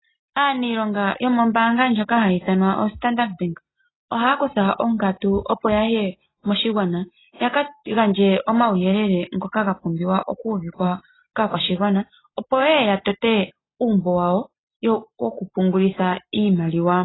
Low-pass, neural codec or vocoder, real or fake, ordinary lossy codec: 7.2 kHz; none; real; AAC, 16 kbps